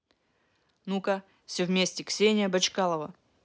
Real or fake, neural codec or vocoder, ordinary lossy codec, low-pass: real; none; none; none